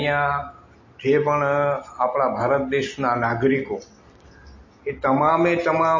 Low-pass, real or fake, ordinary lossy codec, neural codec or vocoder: 7.2 kHz; real; MP3, 32 kbps; none